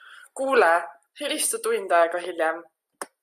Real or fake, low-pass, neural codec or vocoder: real; 14.4 kHz; none